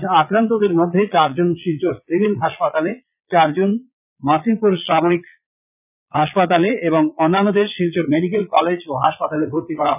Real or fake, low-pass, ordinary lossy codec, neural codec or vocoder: fake; 3.6 kHz; none; vocoder, 22.05 kHz, 80 mel bands, Vocos